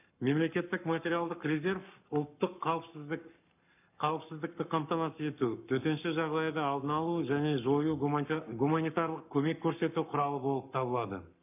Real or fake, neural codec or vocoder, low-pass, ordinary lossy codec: fake; codec, 44.1 kHz, 7.8 kbps, Pupu-Codec; 3.6 kHz; none